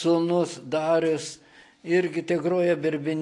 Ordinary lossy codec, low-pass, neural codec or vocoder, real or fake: AAC, 48 kbps; 10.8 kHz; none; real